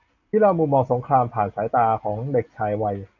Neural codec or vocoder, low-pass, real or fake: none; 7.2 kHz; real